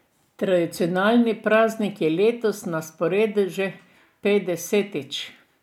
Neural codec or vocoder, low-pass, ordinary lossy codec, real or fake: none; 19.8 kHz; MP3, 96 kbps; real